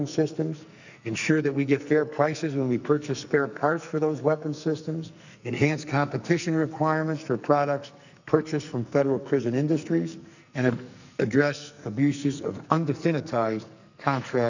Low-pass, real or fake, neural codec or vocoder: 7.2 kHz; fake; codec, 44.1 kHz, 2.6 kbps, SNAC